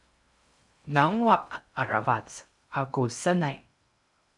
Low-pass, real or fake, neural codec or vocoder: 10.8 kHz; fake; codec, 16 kHz in and 24 kHz out, 0.6 kbps, FocalCodec, streaming, 2048 codes